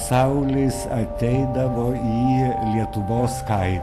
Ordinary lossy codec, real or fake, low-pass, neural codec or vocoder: AAC, 64 kbps; real; 14.4 kHz; none